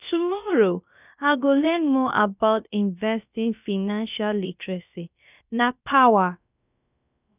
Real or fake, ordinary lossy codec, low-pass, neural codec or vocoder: fake; none; 3.6 kHz; codec, 16 kHz, about 1 kbps, DyCAST, with the encoder's durations